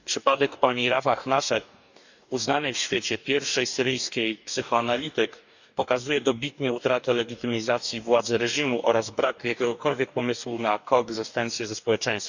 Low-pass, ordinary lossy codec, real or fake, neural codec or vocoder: 7.2 kHz; none; fake; codec, 44.1 kHz, 2.6 kbps, DAC